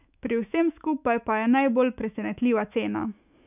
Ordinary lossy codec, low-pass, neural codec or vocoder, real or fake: none; 3.6 kHz; autoencoder, 48 kHz, 128 numbers a frame, DAC-VAE, trained on Japanese speech; fake